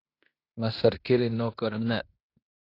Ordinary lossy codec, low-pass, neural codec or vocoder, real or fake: AAC, 32 kbps; 5.4 kHz; codec, 16 kHz in and 24 kHz out, 0.9 kbps, LongCat-Audio-Codec, fine tuned four codebook decoder; fake